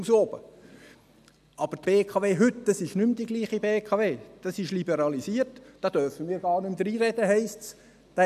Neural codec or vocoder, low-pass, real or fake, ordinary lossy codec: none; 14.4 kHz; real; none